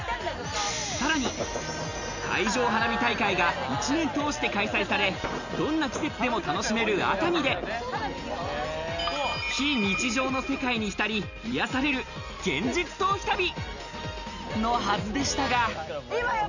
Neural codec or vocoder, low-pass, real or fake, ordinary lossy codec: none; 7.2 kHz; real; none